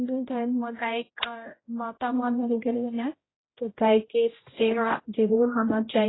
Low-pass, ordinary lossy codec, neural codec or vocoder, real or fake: 7.2 kHz; AAC, 16 kbps; codec, 16 kHz, 0.5 kbps, X-Codec, HuBERT features, trained on general audio; fake